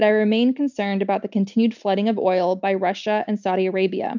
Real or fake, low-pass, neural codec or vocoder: real; 7.2 kHz; none